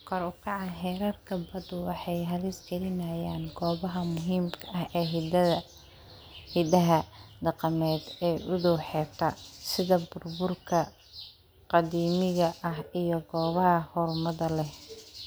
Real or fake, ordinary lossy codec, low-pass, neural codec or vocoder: real; none; none; none